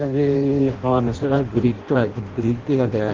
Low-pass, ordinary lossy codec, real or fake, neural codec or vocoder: 7.2 kHz; Opus, 16 kbps; fake; codec, 16 kHz in and 24 kHz out, 0.6 kbps, FireRedTTS-2 codec